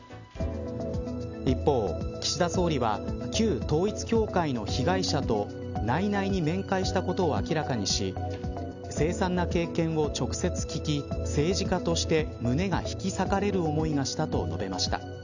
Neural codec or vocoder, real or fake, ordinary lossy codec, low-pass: none; real; none; 7.2 kHz